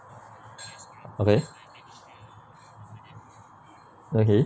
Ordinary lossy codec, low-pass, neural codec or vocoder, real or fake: none; none; none; real